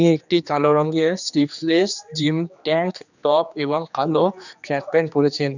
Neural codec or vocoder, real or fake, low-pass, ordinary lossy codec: codec, 16 kHz, 2 kbps, X-Codec, HuBERT features, trained on general audio; fake; 7.2 kHz; none